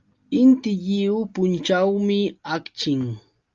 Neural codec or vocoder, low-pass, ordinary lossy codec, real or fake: none; 7.2 kHz; Opus, 32 kbps; real